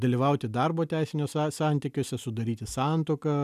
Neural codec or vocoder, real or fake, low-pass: none; real; 14.4 kHz